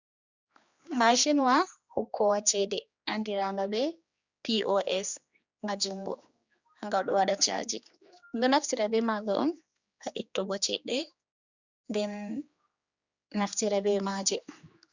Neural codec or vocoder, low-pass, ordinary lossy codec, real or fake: codec, 16 kHz, 2 kbps, X-Codec, HuBERT features, trained on general audio; 7.2 kHz; Opus, 64 kbps; fake